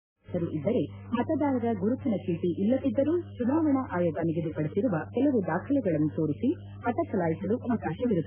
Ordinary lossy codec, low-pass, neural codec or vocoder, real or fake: none; 3.6 kHz; none; real